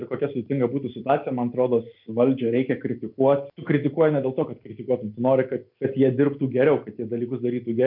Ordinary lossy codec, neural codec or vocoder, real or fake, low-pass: MP3, 48 kbps; none; real; 5.4 kHz